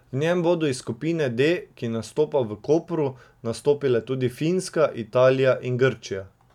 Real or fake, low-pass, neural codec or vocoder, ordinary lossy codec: real; 19.8 kHz; none; none